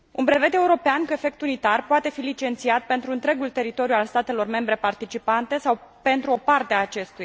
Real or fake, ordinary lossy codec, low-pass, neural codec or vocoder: real; none; none; none